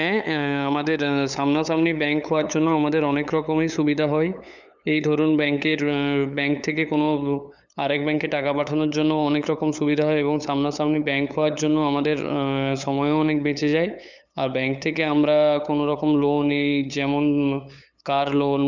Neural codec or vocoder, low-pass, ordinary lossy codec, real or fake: codec, 16 kHz, 8 kbps, FunCodec, trained on LibriTTS, 25 frames a second; 7.2 kHz; none; fake